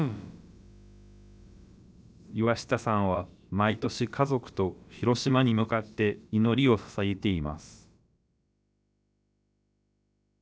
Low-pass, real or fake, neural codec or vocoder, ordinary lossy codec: none; fake; codec, 16 kHz, about 1 kbps, DyCAST, with the encoder's durations; none